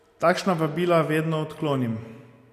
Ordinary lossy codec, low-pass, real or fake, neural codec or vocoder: AAC, 64 kbps; 14.4 kHz; real; none